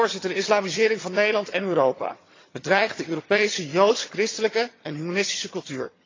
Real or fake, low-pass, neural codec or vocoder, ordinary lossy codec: fake; 7.2 kHz; vocoder, 22.05 kHz, 80 mel bands, HiFi-GAN; AAC, 32 kbps